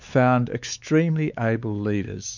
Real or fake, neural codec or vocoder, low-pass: fake; codec, 16 kHz, 4 kbps, X-Codec, WavLM features, trained on Multilingual LibriSpeech; 7.2 kHz